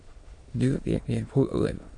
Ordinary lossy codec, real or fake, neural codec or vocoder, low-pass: MP3, 48 kbps; fake; autoencoder, 22.05 kHz, a latent of 192 numbers a frame, VITS, trained on many speakers; 9.9 kHz